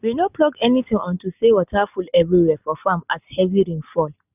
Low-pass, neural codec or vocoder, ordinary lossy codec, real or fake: 3.6 kHz; none; none; real